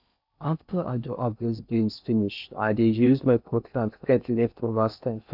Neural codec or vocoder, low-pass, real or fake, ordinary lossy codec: codec, 16 kHz in and 24 kHz out, 0.6 kbps, FocalCodec, streaming, 4096 codes; 5.4 kHz; fake; none